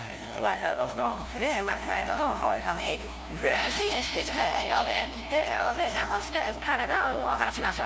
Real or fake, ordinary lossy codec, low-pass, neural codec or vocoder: fake; none; none; codec, 16 kHz, 0.5 kbps, FunCodec, trained on LibriTTS, 25 frames a second